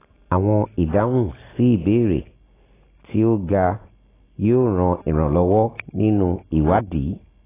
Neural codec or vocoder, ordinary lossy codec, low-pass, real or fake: none; AAC, 16 kbps; 3.6 kHz; real